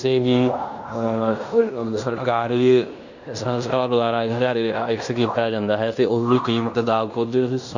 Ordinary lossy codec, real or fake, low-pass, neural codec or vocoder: none; fake; 7.2 kHz; codec, 16 kHz in and 24 kHz out, 0.9 kbps, LongCat-Audio-Codec, fine tuned four codebook decoder